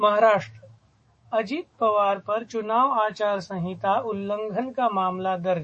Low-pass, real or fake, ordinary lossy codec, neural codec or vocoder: 10.8 kHz; fake; MP3, 32 kbps; autoencoder, 48 kHz, 128 numbers a frame, DAC-VAE, trained on Japanese speech